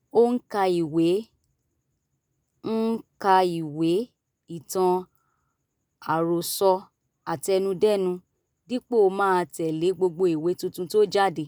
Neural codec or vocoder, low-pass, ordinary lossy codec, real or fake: none; none; none; real